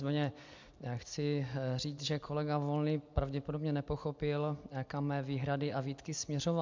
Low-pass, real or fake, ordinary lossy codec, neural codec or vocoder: 7.2 kHz; real; MP3, 64 kbps; none